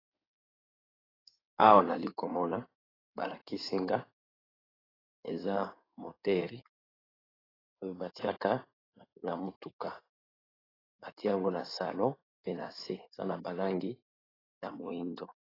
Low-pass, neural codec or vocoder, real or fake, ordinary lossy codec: 5.4 kHz; codec, 16 kHz in and 24 kHz out, 2.2 kbps, FireRedTTS-2 codec; fake; AAC, 24 kbps